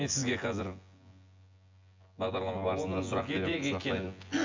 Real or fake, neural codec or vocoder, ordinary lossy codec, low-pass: fake; vocoder, 24 kHz, 100 mel bands, Vocos; MP3, 48 kbps; 7.2 kHz